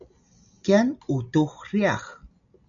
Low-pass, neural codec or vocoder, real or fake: 7.2 kHz; none; real